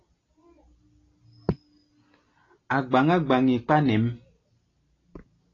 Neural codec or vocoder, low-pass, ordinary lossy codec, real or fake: none; 7.2 kHz; AAC, 32 kbps; real